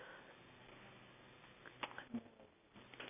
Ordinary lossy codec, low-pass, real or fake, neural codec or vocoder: AAC, 32 kbps; 3.6 kHz; real; none